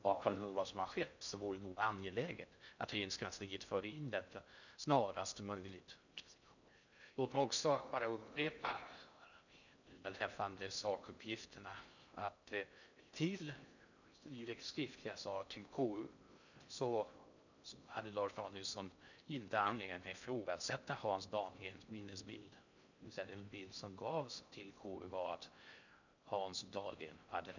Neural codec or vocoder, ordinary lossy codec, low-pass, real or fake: codec, 16 kHz in and 24 kHz out, 0.6 kbps, FocalCodec, streaming, 4096 codes; none; 7.2 kHz; fake